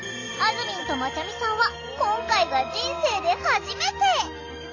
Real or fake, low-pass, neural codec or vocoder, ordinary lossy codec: real; 7.2 kHz; none; AAC, 48 kbps